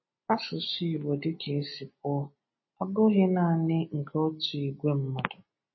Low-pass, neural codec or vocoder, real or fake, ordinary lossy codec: 7.2 kHz; none; real; MP3, 24 kbps